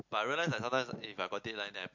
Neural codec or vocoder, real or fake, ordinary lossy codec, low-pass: none; real; MP3, 48 kbps; 7.2 kHz